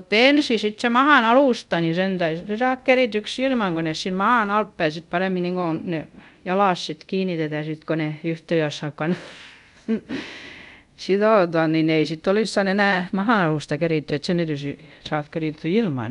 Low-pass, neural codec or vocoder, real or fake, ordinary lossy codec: 10.8 kHz; codec, 24 kHz, 0.5 kbps, DualCodec; fake; none